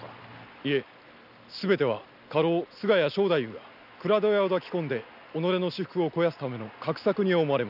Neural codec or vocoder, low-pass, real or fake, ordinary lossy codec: none; 5.4 kHz; real; none